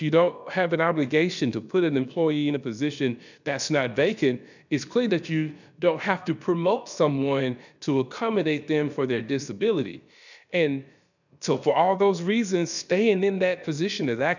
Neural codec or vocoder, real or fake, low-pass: codec, 16 kHz, about 1 kbps, DyCAST, with the encoder's durations; fake; 7.2 kHz